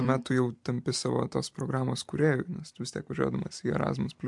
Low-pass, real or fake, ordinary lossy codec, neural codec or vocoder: 10.8 kHz; fake; MP3, 64 kbps; vocoder, 44.1 kHz, 128 mel bands every 256 samples, BigVGAN v2